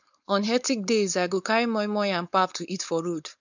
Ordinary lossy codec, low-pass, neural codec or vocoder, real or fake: none; 7.2 kHz; codec, 16 kHz, 4.8 kbps, FACodec; fake